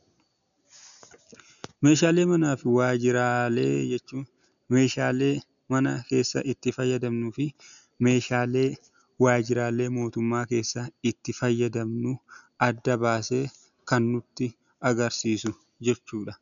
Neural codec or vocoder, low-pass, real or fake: none; 7.2 kHz; real